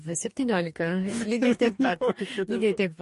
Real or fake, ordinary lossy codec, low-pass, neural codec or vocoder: fake; MP3, 48 kbps; 14.4 kHz; codec, 44.1 kHz, 2.6 kbps, DAC